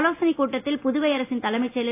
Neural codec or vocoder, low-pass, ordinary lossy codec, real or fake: none; 3.6 kHz; AAC, 24 kbps; real